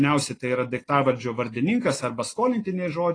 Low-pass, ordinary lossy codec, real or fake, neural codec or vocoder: 9.9 kHz; AAC, 32 kbps; real; none